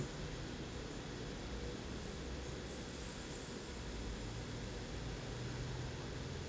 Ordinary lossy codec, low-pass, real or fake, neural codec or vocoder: none; none; real; none